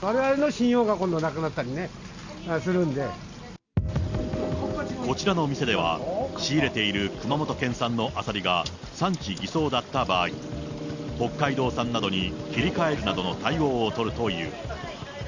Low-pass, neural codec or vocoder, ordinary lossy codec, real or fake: 7.2 kHz; none; Opus, 64 kbps; real